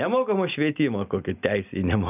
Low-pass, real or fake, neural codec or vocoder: 3.6 kHz; real; none